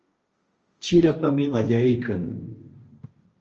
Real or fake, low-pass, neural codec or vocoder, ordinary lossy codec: fake; 7.2 kHz; codec, 16 kHz, 1.1 kbps, Voila-Tokenizer; Opus, 24 kbps